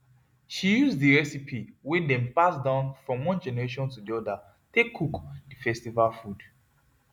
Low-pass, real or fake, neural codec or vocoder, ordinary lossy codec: 19.8 kHz; real; none; none